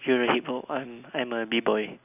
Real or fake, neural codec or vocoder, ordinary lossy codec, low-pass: real; none; none; 3.6 kHz